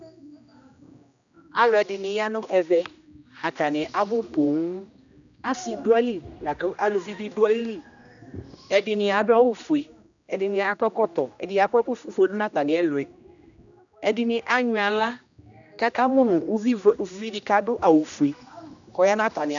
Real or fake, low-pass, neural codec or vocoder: fake; 7.2 kHz; codec, 16 kHz, 1 kbps, X-Codec, HuBERT features, trained on general audio